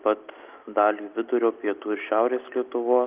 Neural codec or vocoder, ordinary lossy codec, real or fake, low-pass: none; Opus, 32 kbps; real; 3.6 kHz